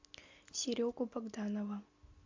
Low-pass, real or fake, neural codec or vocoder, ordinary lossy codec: 7.2 kHz; real; none; MP3, 64 kbps